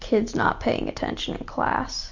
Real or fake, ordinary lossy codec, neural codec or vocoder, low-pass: real; MP3, 48 kbps; none; 7.2 kHz